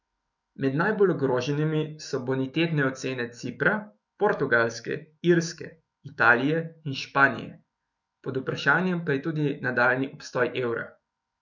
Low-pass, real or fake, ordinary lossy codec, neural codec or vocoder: 7.2 kHz; fake; none; autoencoder, 48 kHz, 128 numbers a frame, DAC-VAE, trained on Japanese speech